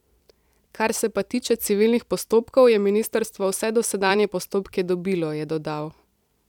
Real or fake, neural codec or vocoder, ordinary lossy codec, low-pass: fake; vocoder, 44.1 kHz, 128 mel bands every 256 samples, BigVGAN v2; none; 19.8 kHz